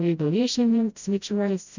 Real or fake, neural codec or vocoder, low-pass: fake; codec, 16 kHz, 0.5 kbps, FreqCodec, smaller model; 7.2 kHz